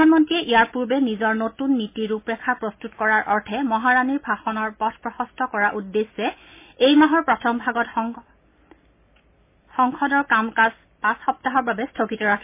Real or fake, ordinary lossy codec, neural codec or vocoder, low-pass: real; MP3, 24 kbps; none; 3.6 kHz